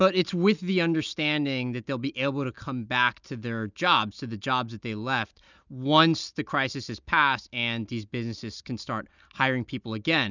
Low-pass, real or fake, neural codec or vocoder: 7.2 kHz; real; none